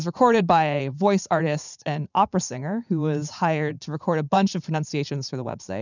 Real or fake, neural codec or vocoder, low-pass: fake; vocoder, 44.1 kHz, 80 mel bands, Vocos; 7.2 kHz